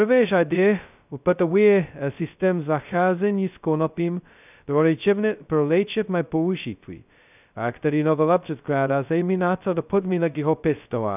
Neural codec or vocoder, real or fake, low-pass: codec, 16 kHz, 0.2 kbps, FocalCodec; fake; 3.6 kHz